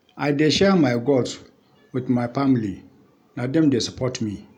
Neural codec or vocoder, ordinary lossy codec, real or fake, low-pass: none; none; real; 19.8 kHz